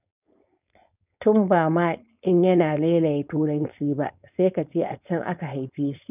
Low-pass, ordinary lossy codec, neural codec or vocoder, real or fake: 3.6 kHz; none; codec, 16 kHz, 4.8 kbps, FACodec; fake